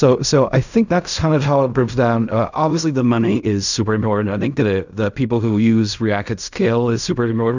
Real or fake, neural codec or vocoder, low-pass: fake; codec, 16 kHz in and 24 kHz out, 0.4 kbps, LongCat-Audio-Codec, fine tuned four codebook decoder; 7.2 kHz